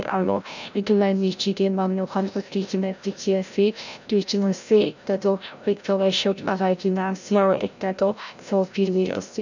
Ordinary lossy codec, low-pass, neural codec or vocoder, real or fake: none; 7.2 kHz; codec, 16 kHz, 0.5 kbps, FreqCodec, larger model; fake